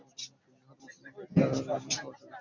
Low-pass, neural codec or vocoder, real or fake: 7.2 kHz; none; real